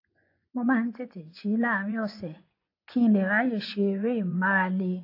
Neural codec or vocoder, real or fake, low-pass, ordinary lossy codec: vocoder, 24 kHz, 100 mel bands, Vocos; fake; 5.4 kHz; AAC, 32 kbps